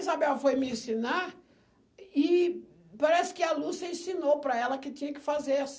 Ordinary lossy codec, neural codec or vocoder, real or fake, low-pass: none; none; real; none